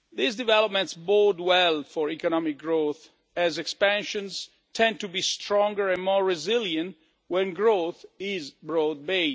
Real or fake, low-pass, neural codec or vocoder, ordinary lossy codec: real; none; none; none